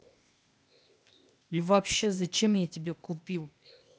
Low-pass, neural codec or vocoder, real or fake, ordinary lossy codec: none; codec, 16 kHz, 0.8 kbps, ZipCodec; fake; none